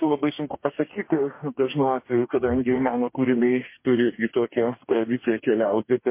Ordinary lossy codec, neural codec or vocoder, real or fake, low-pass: MP3, 24 kbps; codec, 44.1 kHz, 2.6 kbps, DAC; fake; 3.6 kHz